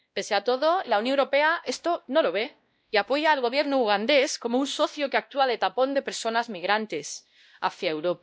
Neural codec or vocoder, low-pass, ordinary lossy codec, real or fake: codec, 16 kHz, 1 kbps, X-Codec, WavLM features, trained on Multilingual LibriSpeech; none; none; fake